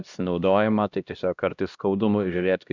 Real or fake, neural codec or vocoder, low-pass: fake; codec, 16 kHz, 1 kbps, X-Codec, HuBERT features, trained on LibriSpeech; 7.2 kHz